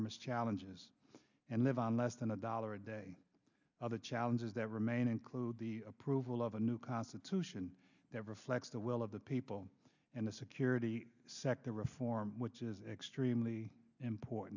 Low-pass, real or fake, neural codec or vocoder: 7.2 kHz; real; none